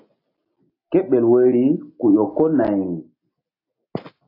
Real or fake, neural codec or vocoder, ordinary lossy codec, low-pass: real; none; AAC, 32 kbps; 5.4 kHz